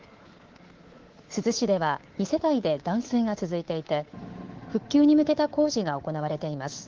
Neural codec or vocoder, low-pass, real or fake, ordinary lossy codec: codec, 24 kHz, 3.1 kbps, DualCodec; 7.2 kHz; fake; Opus, 16 kbps